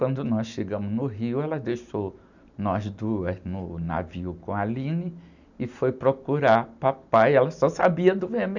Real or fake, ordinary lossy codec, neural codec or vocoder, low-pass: real; none; none; 7.2 kHz